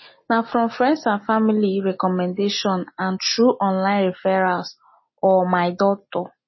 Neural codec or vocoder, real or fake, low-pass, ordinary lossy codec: none; real; 7.2 kHz; MP3, 24 kbps